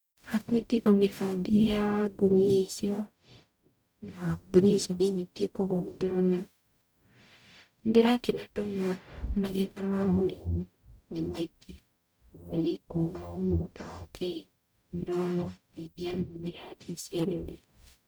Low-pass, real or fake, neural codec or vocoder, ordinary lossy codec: none; fake; codec, 44.1 kHz, 0.9 kbps, DAC; none